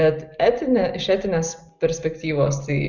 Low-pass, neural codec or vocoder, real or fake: 7.2 kHz; none; real